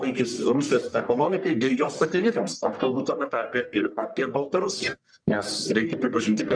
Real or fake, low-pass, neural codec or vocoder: fake; 9.9 kHz; codec, 44.1 kHz, 1.7 kbps, Pupu-Codec